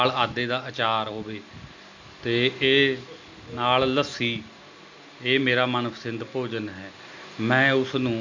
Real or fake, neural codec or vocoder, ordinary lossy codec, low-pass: real; none; MP3, 64 kbps; 7.2 kHz